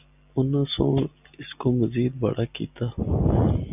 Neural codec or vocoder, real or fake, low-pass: none; real; 3.6 kHz